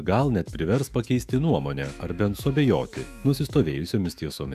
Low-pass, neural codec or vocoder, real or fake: 14.4 kHz; autoencoder, 48 kHz, 128 numbers a frame, DAC-VAE, trained on Japanese speech; fake